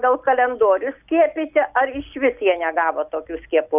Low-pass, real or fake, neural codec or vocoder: 3.6 kHz; real; none